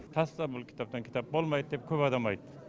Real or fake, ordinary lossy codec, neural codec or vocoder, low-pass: real; none; none; none